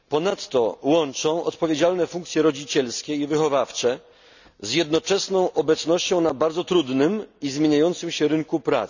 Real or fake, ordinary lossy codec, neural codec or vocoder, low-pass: real; none; none; 7.2 kHz